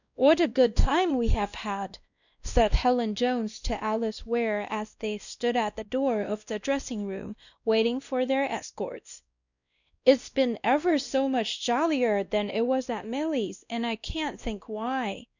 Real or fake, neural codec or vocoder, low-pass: fake; codec, 16 kHz, 1 kbps, X-Codec, WavLM features, trained on Multilingual LibriSpeech; 7.2 kHz